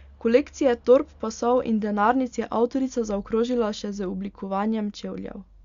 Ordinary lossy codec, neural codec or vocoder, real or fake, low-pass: Opus, 64 kbps; none; real; 7.2 kHz